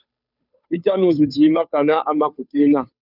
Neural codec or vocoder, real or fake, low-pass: codec, 16 kHz, 2 kbps, FunCodec, trained on Chinese and English, 25 frames a second; fake; 5.4 kHz